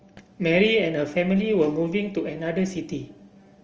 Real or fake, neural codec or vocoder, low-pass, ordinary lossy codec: real; none; 7.2 kHz; Opus, 24 kbps